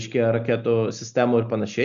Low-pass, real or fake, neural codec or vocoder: 7.2 kHz; real; none